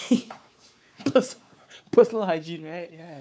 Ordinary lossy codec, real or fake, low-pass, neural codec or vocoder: none; fake; none; codec, 16 kHz, 4 kbps, X-Codec, WavLM features, trained on Multilingual LibriSpeech